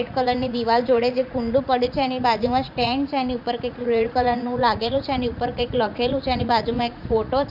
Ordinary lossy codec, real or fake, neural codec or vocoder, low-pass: none; fake; vocoder, 44.1 kHz, 80 mel bands, Vocos; 5.4 kHz